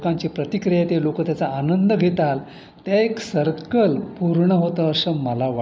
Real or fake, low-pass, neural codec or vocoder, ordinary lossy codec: real; none; none; none